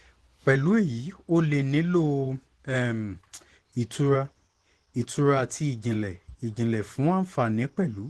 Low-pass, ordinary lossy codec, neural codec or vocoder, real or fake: 10.8 kHz; Opus, 16 kbps; vocoder, 24 kHz, 100 mel bands, Vocos; fake